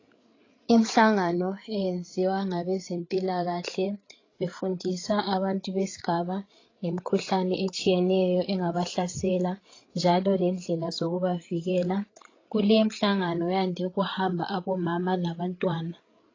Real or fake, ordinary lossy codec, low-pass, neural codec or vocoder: fake; AAC, 32 kbps; 7.2 kHz; codec, 16 kHz, 8 kbps, FreqCodec, larger model